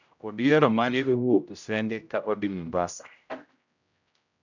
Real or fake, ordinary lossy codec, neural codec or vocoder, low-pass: fake; none; codec, 16 kHz, 0.5 kbps, X-Codec, HuBERT features, trained on general audio; 7.2 kHz